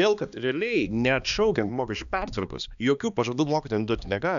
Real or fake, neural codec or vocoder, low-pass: fake; codec, 16 kHz, 2 kbps, X-Codec, HuBERT features, trained on balanced general audio; 7.2 kHz